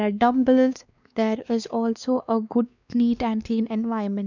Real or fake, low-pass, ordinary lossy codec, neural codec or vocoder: fake; 7.2 kHz; none; codec, 16 kHz, 2 kbps, X-Codec, WavLM features, trained on Multilingual LibriSpeech